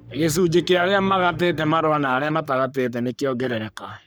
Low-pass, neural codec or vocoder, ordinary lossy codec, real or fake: none; codec, 44.1 kHz, 3.4 kbps, Pupu-Codec; none; fake